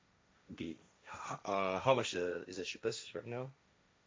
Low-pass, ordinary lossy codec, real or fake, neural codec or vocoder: none; none; fake; codec, 16 kHz, 1.1 kbps, Voila-Tokenizer